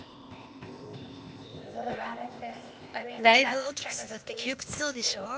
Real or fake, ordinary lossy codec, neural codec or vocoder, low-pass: fake; none; codec, 16 kHz, 0.8 kbps, ZipCodec; none